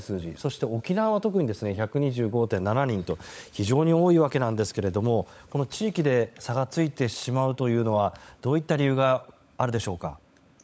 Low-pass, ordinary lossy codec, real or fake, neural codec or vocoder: none; none; fake; codec, 16 kHz, 16 kbps, FunCodec, trained on LibriTTS, 50 frames a second